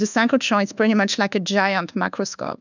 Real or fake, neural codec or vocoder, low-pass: fake; codec, 24 kHz, 1.2 kbps, DualCodec; 7.2 kHz